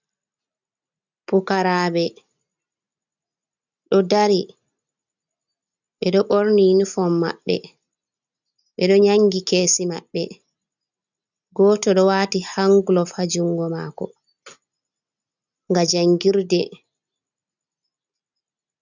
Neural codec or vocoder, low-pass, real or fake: none; 7.2 kHz; real